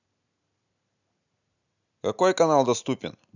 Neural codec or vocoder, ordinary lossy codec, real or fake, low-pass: none; none; real; 7.2 kHz